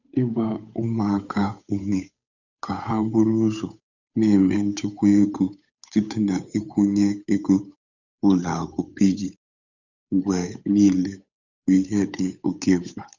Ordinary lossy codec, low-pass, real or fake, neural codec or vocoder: none; 7.2 kHz; fake; codec, 16 kHz, 8 kbps, FunCodec, trained on Chinese and English, 25 frames a second